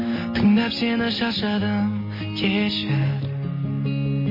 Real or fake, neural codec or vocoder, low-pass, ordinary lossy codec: real; none; 5.4 kHz; MP3, 24 kbps